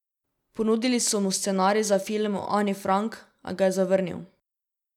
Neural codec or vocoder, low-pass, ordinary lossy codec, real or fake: none; 19.8 kHz; none; real